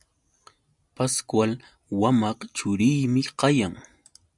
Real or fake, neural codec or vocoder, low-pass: real; none; 10.8 kHz